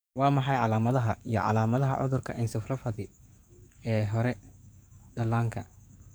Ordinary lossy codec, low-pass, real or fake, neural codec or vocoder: none; none; fake; codec, 44.1 kHz, 7.8 kbps, DAC